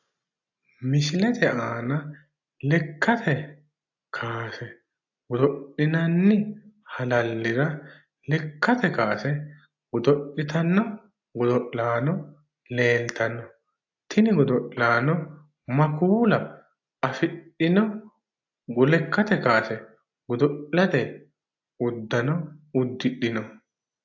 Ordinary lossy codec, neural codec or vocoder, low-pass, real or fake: AAC, 48 kbps; none; 7.2 kHz; real